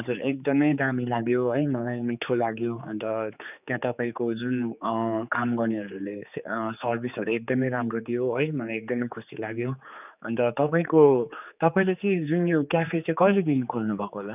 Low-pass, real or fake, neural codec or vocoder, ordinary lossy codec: 3.6 kHz; fake; codec, 16 kHz, 4 kbps, X-Codec, HuBERT features, trained on general audio; none